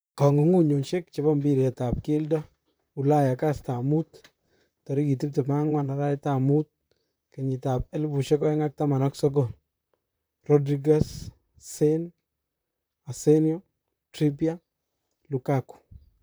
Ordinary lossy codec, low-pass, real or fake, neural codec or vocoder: none; none; fake; vocoder, 44.1 kHz, 128 mel bands, Pupu-Vocoder